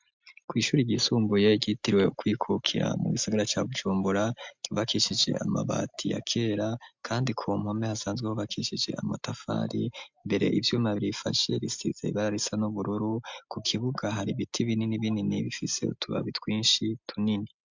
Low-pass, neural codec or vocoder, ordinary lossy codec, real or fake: 7.2 kHz; none; MP3, 64 kbps; real